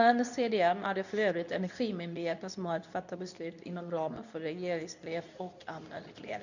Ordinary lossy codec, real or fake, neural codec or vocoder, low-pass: none; fake; codec, 24 kHz, 0.9 kbps, WavTokenizer, medium speech release version 2; 7.2 kHz